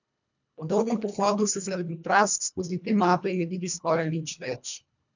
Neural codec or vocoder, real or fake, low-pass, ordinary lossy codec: codec, 24 kHz, 1.5 kbps, HILCodec; fake; 7.2 kHz; none